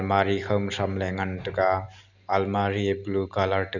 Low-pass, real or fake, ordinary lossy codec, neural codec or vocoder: 7.2 kHz; real; none; none